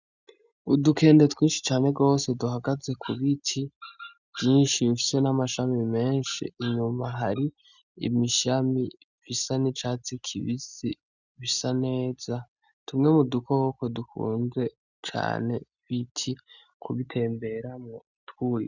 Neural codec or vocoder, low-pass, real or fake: none; 7.2 kHz; real